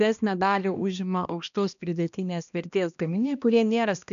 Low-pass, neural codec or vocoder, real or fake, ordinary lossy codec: 7.2 kHz; codec, 16 kHz, 1 kbps, X-Codec, HuBERT features, trained on balanced general audio; fake; MP3, 96 kbps